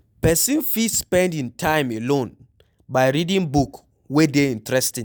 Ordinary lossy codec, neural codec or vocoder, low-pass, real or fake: none; none; none; real